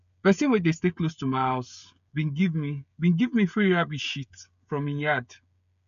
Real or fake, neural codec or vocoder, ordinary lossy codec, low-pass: fake; codec, 16 kHz, 16 kbps, FreqCodec, smaller model; none; 7.2 kHz